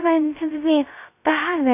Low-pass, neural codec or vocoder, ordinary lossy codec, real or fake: 3.6 kHz; codec, 16 kHz, 0.2 kbps, FocalCodec; AAC, 32 kbps; fake